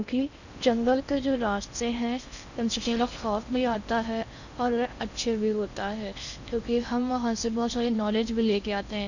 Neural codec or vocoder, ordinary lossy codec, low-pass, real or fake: codec, 16 kHz in and 24 kHz out, 0.6 kbps, FocalCodec, streaming, 4096 codes; none; 7.2 kHz; fake